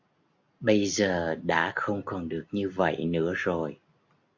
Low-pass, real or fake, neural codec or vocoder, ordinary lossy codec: 7.2 kHz; real; none; AAC, 48 kbps